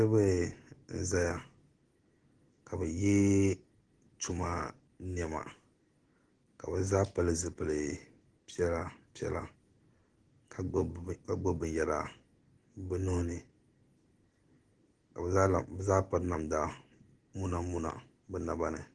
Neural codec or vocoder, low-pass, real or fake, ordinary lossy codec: none; 9.9 kHz; real; Opus, 16 kbps